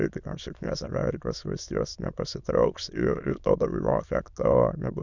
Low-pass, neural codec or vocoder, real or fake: 7.2 kHz; autoencoder, 22.05 kHz, a latent of 192 numbers a frame, VITS, trained on many speakers; fake